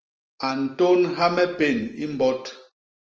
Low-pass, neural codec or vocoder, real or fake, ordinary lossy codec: 7.2 kHz; none; real; Opus, 24 kbps